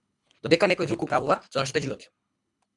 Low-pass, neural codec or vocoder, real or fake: 10.8 kHz; codec, 24 kHz, 3 kbps, HILCodec; fake